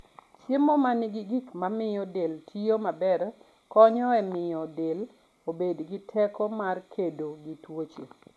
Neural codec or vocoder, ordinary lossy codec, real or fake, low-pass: none; none; real; none